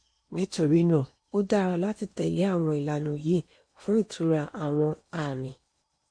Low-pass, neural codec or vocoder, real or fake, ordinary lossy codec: 9.9 kHz; codec, 16 kHz in and 24 kHz out, 0.8 kbps, FocalCodec, streaming, 65536 codes; fake; MP3, 48 kbps